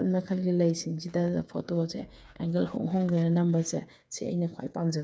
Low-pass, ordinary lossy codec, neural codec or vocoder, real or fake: none; none; codec, 16 kHz, 8 kbps, FreqCodec, smaller model; fake